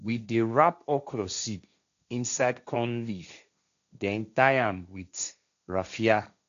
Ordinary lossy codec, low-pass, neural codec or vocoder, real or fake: none; 7.2 kHz; codec, 16 kHz, 1.1 kbps, Voila-Tokenizer; fake